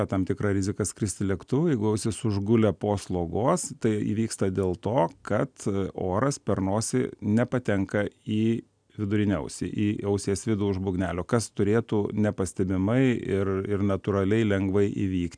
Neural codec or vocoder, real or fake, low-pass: none; real; 9.9 kHz